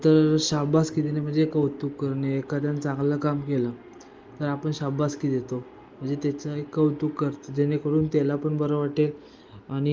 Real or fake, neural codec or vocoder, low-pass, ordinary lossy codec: real; none; 7.2 kHz; Opus, 32 kbps